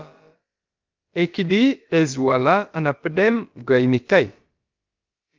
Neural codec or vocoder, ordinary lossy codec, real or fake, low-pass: codec, 16 kHz, about 1 kbps, DyCAST, with the encoder's durations; Opus, 24 kbps; fake; 7.2 kHz